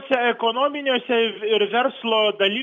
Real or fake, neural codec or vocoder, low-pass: real; none; 7.2 kHz